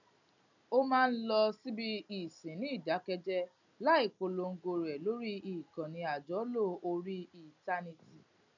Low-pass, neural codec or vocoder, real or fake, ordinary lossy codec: 7.2 kHz; none; real; none